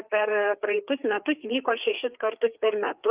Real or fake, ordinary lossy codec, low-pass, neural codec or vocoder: fake; Opus, 32 kbps; 3.6 kHz; codec, 16 kHz, 4 kbps, FreqCodec, larger model